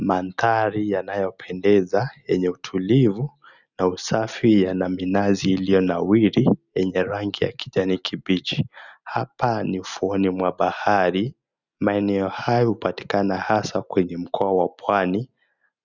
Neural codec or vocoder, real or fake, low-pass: none; real; 7.2 kHz